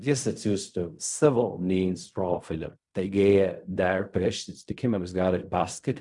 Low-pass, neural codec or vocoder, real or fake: 10.8 kHz; codec, 16 kHz in and 24 kHz out, 0.4 kbps, LongCat-Audio-Codec, fine tuned four codebook decoder; fake